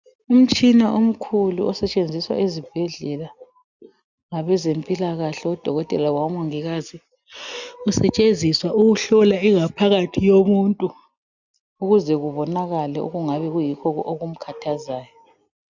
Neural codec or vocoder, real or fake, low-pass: none; real; 7.2 kHz